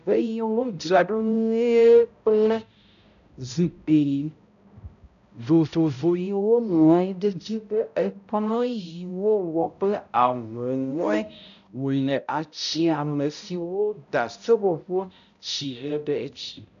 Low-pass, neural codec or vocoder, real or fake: 7.2 kHz; codec, 16 kHz, 0.5 kbps, X-Codec, HuBERT features, trained on balanced general audio; fake